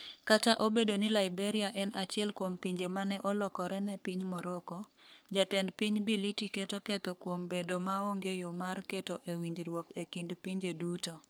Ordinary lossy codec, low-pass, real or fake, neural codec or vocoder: none; none; fake; codec, 44.1 kHz, 3.4 kbps, Pupu-Codec